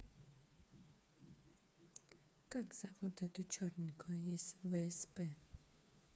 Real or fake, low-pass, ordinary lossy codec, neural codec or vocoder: fake; none; none; codec, 16 kHz, 4 kbps, FreqCodec, smaller model